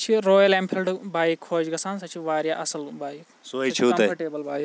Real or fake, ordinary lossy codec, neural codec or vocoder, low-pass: real; none; none; none